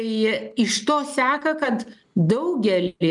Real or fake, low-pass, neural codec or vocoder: real; 10.8 kHz; none